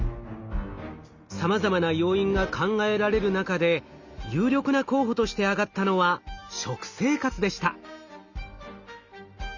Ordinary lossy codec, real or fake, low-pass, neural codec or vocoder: Opus, 64 kbps; real; 7.2 kHz; none